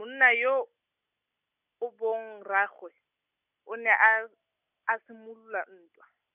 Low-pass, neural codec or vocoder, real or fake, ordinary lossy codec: 3.6 kHz; none; real; none